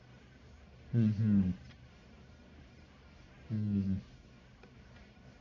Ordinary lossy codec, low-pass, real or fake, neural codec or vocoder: MP3, 64 kbps; 7.2 kHz; fake; codec, 44.1 kHz, 1.7 kbps, Pupu-Codec